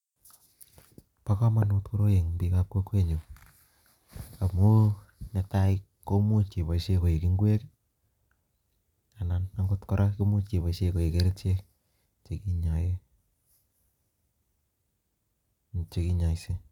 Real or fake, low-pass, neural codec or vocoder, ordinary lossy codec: real; 19.8 kHz; none; none